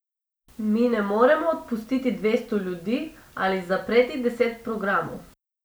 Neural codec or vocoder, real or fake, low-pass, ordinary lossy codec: none; real; none; none